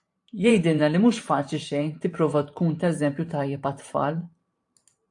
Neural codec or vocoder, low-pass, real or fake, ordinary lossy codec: vocoder, 44.1 kHz, 128 mel bands every 512 samples, BigVGAN v2; 10.8 kHz; fake; AAC, 64 kbps